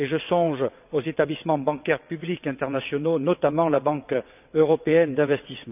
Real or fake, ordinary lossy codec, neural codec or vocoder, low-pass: fake; none; vocoder, 22.05 kHz, 80 mel bands, WaveNeXt; 3.6 kHz